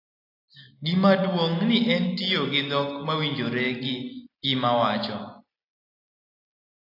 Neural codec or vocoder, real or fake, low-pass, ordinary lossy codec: none; real; 5.4 kHz; AAC, 32 kbps